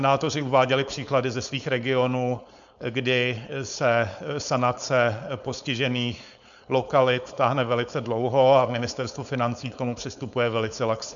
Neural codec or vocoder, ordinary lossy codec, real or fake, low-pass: codec, 16 kHz, 4.8 kbps, FACodec; MP3, 96 kbps; fake; 7.2 kHz